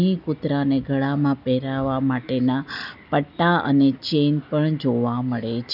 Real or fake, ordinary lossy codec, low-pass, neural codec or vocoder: real; none; 5.4 kHz; none